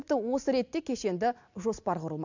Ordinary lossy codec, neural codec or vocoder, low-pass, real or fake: none; none; 7.2 kHz; real